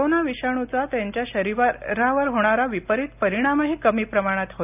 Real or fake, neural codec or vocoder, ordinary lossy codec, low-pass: real; none; none; 3.6 kHz